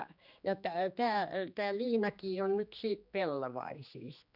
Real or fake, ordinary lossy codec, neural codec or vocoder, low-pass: fake; none; codec, 16 kHz, 2 kbps, X-Codec, HuBERT features, trained on general audio; 5.4 kHz